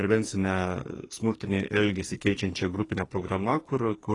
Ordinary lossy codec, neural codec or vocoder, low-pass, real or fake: AAC, 32 kbps; codec, 44.1 kHz, 2.6 kbps, SNAC; 10.8 kHz; fake